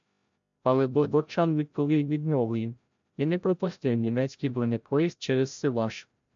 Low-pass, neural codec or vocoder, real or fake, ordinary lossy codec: 7.2 kHz; codec, 16 kHz, 0.5 kbps, FreqCodec, larger model; fake; MP3, 64 kbps